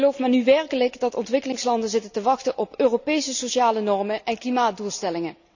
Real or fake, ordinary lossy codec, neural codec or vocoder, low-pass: real; none; none; 7.2 kHz